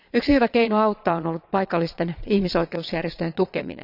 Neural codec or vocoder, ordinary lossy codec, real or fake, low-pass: vocoder, 22.05 kHz, 80 mel bands, Vocos; none; fake; 5.4 kHz